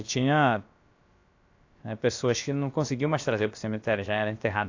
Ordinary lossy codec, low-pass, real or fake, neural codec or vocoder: AAC, 48 kbps; 7.2 kHz; fake; codec, 16 kHz, about 1 kbps, DyCAST, with the encoder's durations